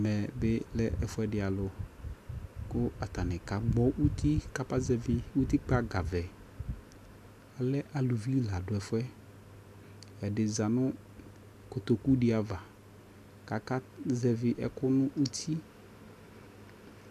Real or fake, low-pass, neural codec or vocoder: real; 14.4 kHz; none